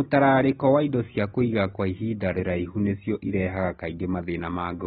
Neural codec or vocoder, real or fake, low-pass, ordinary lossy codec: none; real; 19.8 kHz; AAC, 16 kbps